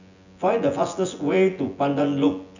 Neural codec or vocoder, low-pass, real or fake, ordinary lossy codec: vocoder, 24 kHz, 100 mel bands, Vocos; 7.2 kHz; fake; none